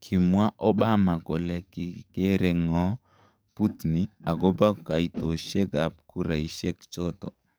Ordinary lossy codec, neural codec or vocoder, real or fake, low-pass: none; codec, 44.1 kHz, 7.8 kbps, DAC; fake; none